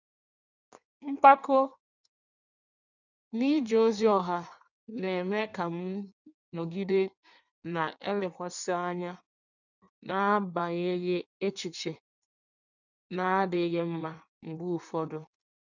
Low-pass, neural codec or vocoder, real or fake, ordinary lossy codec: 7.2 kHz; codec, 16 kHz in and 24 kHz out, 1.1 kbps, FireRedTTS-2 codec; fake; none